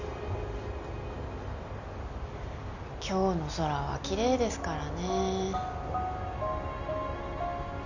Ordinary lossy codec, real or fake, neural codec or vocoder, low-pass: none; real; none; 7.2 kHz